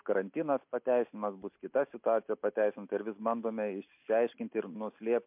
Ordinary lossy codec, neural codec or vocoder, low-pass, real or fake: MP3, 32 kbps; none; 3.6 kHz; real